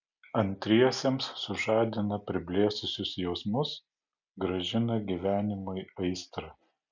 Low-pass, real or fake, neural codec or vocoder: 7.2 kHz; real; none